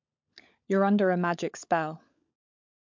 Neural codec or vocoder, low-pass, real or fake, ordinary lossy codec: codec, 16 kHz, 16 kbps, FunCodec, trained on LibriTTS, 50 frames a second; 7.2 kHz; fake; MP3, 64 kbps